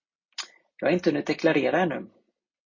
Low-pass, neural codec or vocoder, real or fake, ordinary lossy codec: 7.2 kHz; none; real; MP3, 32 kbps